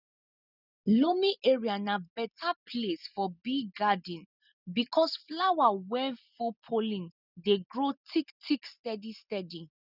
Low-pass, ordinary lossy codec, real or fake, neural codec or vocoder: 5.4 kHz; none; real; none